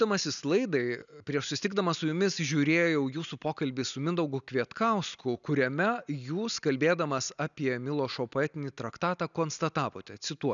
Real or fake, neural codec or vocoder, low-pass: real; none; 7.2 kHz